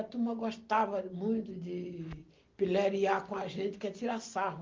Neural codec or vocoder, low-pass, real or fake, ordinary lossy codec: none; 7.2 kHz; real; Opus, 32 kbps